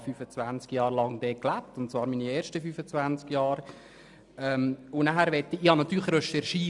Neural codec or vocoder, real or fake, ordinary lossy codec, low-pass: none; real; none; 10.8 kHz